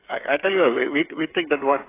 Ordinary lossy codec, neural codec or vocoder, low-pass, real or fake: AAC, 16 kbps; codec, 16 kHz, 8 kbps, FreqCodec, smaller model; 3.6 kHz; fake